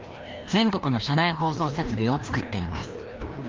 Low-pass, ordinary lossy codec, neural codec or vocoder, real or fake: 7.2 kHz; Opus, 32 kbps; codec, 16 kHz, 1 kbps, FreqCodec, larger model; fake